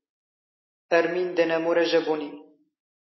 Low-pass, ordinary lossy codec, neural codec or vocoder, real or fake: 7.2 kHz; MP3, 24 kbps; none; real